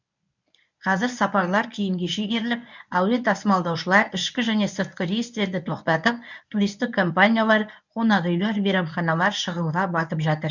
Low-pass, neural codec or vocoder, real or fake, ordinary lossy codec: 7.2 kHz; codec, 24 kHz, 0.9 kbps, WavTokenizer, medium speech release version 1; fake; none